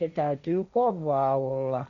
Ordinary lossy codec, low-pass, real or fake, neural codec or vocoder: none; 7.2 kHz; fake; codec, 16 kHz, 1.1 kbps, Voila-Tokenizer